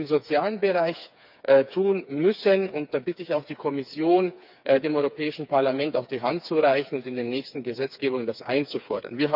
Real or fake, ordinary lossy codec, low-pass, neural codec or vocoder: fake; none; 5.4 kHz; codec, 16 kHz, 4 kbps, FreqCodec, smaller model